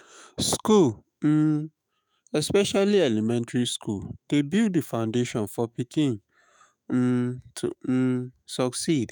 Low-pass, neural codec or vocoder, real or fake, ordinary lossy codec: none; autoencoder, 48 kHz, 128 numbers a frame, DAC-VAE, trained on Japanese speech; fake; none